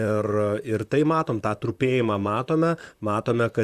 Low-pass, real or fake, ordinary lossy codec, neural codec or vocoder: 14.4 kHz; fake; Opus, 64 kbps; vocoder, 44.1 kHz, 128 mel bands, Pupu-Vocoder